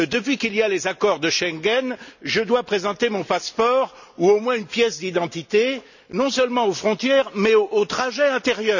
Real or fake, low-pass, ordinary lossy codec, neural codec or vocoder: real; 7.2 kHz; none; none